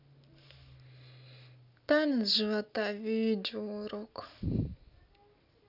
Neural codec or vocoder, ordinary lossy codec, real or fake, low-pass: none; none; real; 5.4 kHz